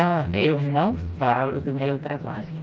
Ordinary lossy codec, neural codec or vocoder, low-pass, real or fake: none; codec, 16 kHz, 1 kbps, FreqCodec, smaller model; none; fake